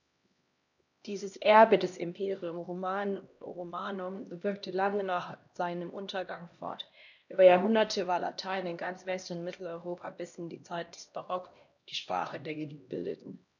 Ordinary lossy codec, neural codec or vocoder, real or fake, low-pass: none; codec, 16 kHz, 1 kbps, X-Codec, HuBERT features, trained on LibriSpeech; fake; 7.2 kHz